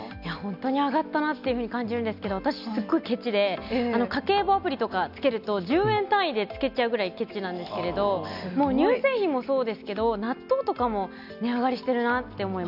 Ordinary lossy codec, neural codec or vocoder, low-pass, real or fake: none; none; 5.4 kHz; real